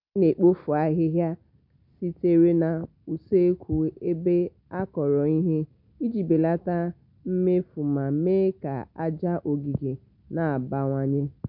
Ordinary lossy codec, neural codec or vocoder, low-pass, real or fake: none; none; 5.4 kHz; real